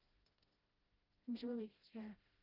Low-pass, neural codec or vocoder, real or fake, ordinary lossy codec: 5.4 kHz; codec, 16 kHz, 0.5 kbps, FreqCodec, smaller model; fake; Opus, 24 kbps